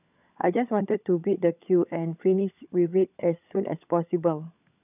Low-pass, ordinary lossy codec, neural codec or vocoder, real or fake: 3.6 kHz; none; codec, 16 kHz, 16 kbps, FunCodec, trained on LibriTTS, 50 frames a second; fake